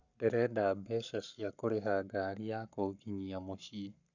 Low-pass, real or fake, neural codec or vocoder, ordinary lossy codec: 7.2 kHz; fake; codec, 44.1 kHz, 7.8 kbps, Pupu-Codec; none